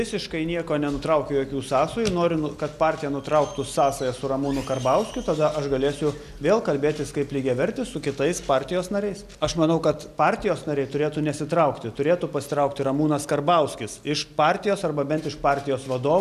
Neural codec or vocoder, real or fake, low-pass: none; real; 14.4 kHz